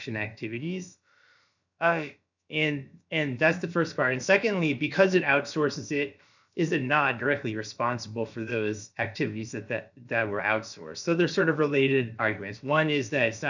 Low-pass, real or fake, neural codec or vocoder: 7.2 kHz; fake; codec, 16 kHz, about 1 kbps, DyCAST, with the encoder's durations